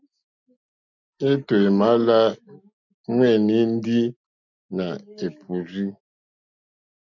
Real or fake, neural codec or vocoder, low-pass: real; none; 7.2 kHz